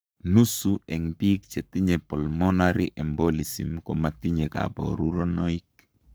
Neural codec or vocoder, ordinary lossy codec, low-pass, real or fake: codec, 44.1 kHz, 7.8 kbps, Pupu-Codec; none; none; fake